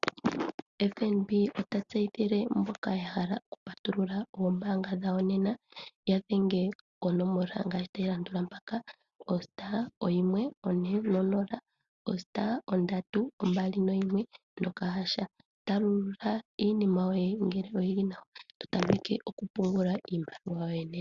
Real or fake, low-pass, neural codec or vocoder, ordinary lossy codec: real; 7.2 kHz; none; Opus, 64 kbps